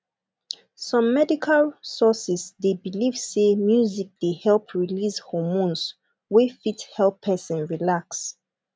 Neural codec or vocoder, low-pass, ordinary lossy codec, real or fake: none; none; none; real